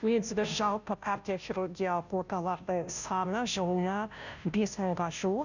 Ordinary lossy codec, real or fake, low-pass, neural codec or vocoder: none; fake; 7.2 kHz; codec, 16 kHz, 0.5 kbps, FunCodec, trained on Chinese and English, 25 frames a second